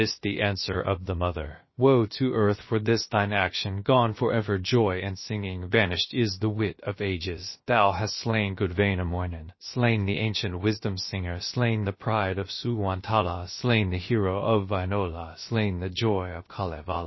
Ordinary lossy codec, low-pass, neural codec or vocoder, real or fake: MP3, 24 kbps; 7.2 kHz; codec, 16 kHz, about 1 kbps, DyCAST, with the encoder's durations; fake